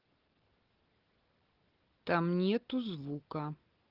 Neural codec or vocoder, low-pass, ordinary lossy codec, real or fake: none; 5.4 kHz; Opus, 16 kbps; real